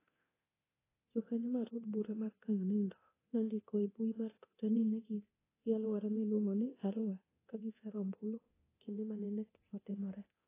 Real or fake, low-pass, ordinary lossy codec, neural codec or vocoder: fake; 3.6 kHz; AAC, 16 kbps; codec, 24 kHz, 0.9 kbps, DualCodec